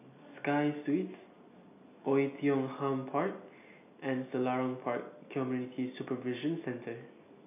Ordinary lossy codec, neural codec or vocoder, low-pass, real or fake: none; none; 3.6 kHz; real